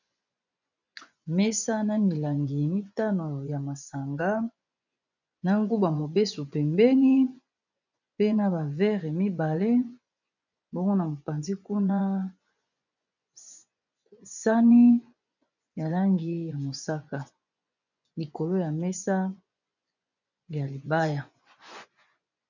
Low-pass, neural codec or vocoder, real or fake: 7.2 kHz; vocoder, 24 kHz, 100 mel bands, Vocos; fake